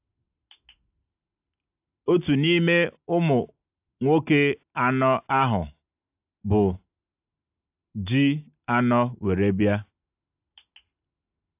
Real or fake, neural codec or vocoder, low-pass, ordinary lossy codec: real; none; 3.6 kHz; AAC, 32 kbps